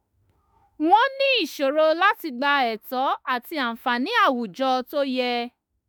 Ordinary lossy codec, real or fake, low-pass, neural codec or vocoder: none; fake; none; autoencoder, 48 kHz, 32 numbers a frame, DAC-VAE, trained on Japanese speech